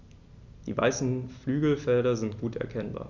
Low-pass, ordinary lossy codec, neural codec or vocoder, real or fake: 7.2 kHz; none; none; real